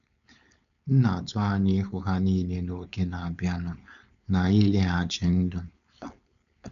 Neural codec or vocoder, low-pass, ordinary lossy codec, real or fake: codec, 16 kHz, 4.8 kbps, FACodec; 7.2 kHz; none; fake